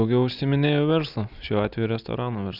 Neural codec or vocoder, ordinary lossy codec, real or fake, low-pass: none; Opus, 64 kbps; real; 5.4 kHz